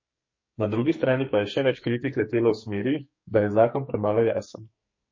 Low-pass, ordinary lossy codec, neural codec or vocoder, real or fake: 7.2 kHz; MP3, 32 kbps; codec, 44.1 kHz, 2.6 kbps, SNAC; fake